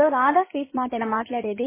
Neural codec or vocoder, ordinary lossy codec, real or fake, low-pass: codec, 16 kHz in and 24 kHz out, 2.2 kbps, FireRedTTS-2 codec; MP3, 16 kbps; fake; 3.6 kHz